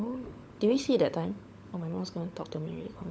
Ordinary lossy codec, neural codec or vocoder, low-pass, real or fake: none; codec, 16 kHz, 16 kbps, FunCodec, trained on LibriTTS, 50 frames a second; none; fake